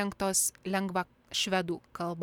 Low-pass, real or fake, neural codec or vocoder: 19.8 kHz; real; none